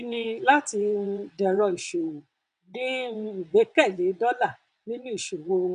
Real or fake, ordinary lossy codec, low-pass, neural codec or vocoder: fake; none; 9.9 kHz; vocoder, 22.05 kHz, 80 mel bands, WaveNeXt